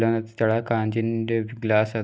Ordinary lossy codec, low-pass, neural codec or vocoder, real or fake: none; none; none; real